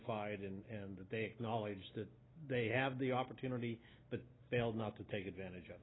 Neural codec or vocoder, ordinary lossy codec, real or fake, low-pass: none; AAC, 16 kbps; real; 7.2 kHz